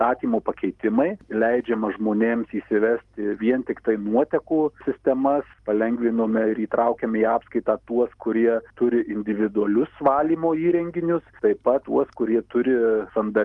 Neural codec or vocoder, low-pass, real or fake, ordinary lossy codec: none; 10.8 kHz; real; Opus, 24 kbps